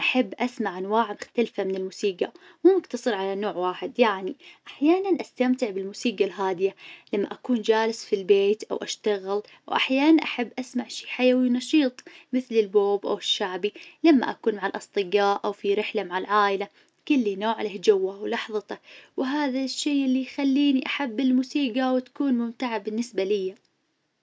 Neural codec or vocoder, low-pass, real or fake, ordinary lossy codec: none; none; real; none